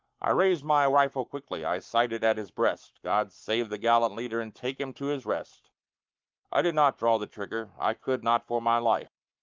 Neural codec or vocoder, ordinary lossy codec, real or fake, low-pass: codec, 44.1 kHz, 7.8 kbps, Pupu-Codec; Opus, 24 kbps; fake; 7.2 kHz